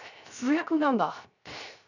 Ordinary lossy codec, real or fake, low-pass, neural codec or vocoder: none; fake; 7.2 kHz; codec, 16 kHz, 0.3 kbps, FocalCodec